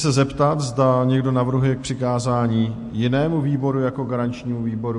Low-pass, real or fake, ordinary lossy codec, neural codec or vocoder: 10.8 kHz; real; MP3, 48 kbps; none